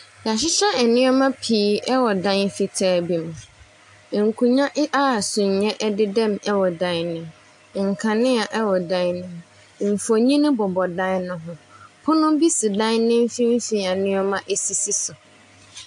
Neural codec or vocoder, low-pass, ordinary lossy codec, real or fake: none; 10.8 kHz; AAC, 64 kbps; real